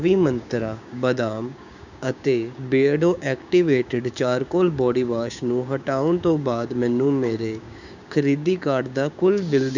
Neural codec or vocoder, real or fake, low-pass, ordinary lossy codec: codec, 16 kHz, 6 kbps, DAC; fake; 7.2 kHz; none